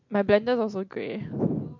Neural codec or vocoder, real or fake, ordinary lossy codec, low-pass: none; real; MP3, 48 kbps; 7.2 kHz